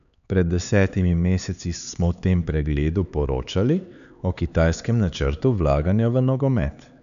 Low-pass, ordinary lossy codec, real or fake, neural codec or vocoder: 7.2 kHz; none; fake; codec, 16 kHz, 4 kbps, X-Codec, HuBERT features, trained on LibriSpeech